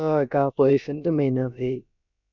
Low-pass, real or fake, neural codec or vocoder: 7.2 kHz; fake; codec, 16 kHz, about 1 kbps, DyCAST, with the encoder's durations